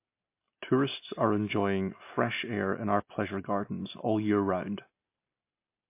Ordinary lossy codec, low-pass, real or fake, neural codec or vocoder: MP3, 24 kbps; 3.6 kHz; real; none